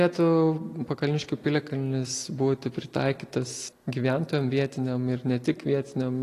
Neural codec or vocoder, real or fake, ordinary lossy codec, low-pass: none; real; AAC, 48 kbps; 14.4 kHz